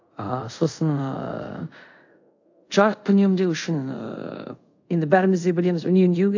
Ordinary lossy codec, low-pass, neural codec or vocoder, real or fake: AAC, 48 kbps; 7.2 kHz; codec, 24 kHz, 0.5 kbps, DualCodec; fake